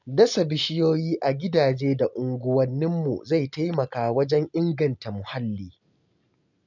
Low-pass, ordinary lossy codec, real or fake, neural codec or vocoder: 7.2 kHz; none; real; none